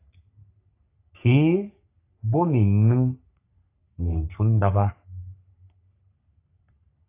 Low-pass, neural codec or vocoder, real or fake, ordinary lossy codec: 3.6 kHz; codec, 44.1 kHz, 3.4 kbps, Pupu-Codec; fake; AAC, 24 kbps